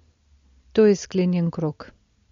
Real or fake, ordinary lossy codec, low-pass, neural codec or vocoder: real; MP3, 48 kbps; 7.2 kHz; none